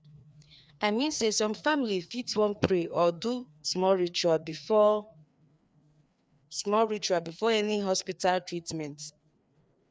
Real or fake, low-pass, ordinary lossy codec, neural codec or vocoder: fake; none; none; codec, 16 kHz, 2 kbps, FreqCodec, larger model